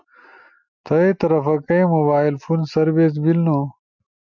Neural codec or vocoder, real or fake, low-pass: none; real; 7.2 kHz